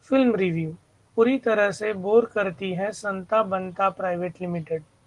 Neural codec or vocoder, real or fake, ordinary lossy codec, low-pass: none; real; Opus, 24 kbps; 10.8 kHz